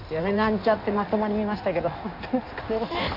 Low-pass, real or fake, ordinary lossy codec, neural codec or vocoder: 5.4 kHz; fake; none; codec, 16 kHz in and 24 kHz out, 1.1 kbps, FireRedTTS-2 codec